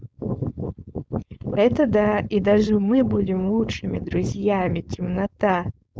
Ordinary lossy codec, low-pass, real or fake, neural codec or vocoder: none; none; fake; codec, 16 kHz, 4.8 kbps, FACodec